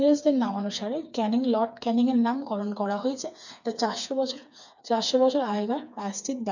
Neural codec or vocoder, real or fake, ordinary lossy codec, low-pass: codec, 16 kHz, 4 kbps, FreqCodec, smaller model; fake; none; 7.2 kHz